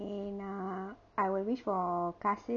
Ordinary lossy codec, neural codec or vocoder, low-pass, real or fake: none; none; 7.2 kHz; real